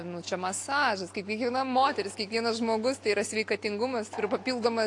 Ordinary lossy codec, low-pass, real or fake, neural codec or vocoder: AAC, 48 kbps; 10.8 kHz; real; none